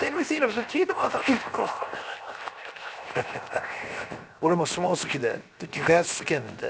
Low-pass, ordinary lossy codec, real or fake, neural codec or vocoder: none; none; fake; codec, 16 kHz, 0.7 kbps, FocalCodec